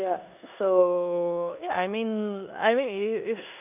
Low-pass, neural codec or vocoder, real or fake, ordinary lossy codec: 3.6 kHz; codec, 16 kHz in and 24 kHz out, 0.9 kbps, LongCat-Audio-Codec, four codebook decoder; fake; none